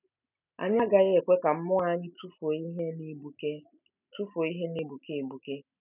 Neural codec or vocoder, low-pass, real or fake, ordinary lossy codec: none; 3.6 kHz; real; none